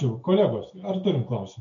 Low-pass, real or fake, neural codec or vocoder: 7.2 kHz; real; none